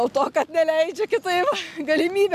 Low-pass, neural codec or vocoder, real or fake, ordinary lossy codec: 14.4 kHz; none; real; MP3, 96 kbps